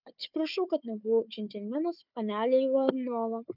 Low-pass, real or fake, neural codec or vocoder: 5.4 kHz; fake; codec, 16 kHz, 4 kbps, FunCodec, trained on Chinese and English, 50 frames a second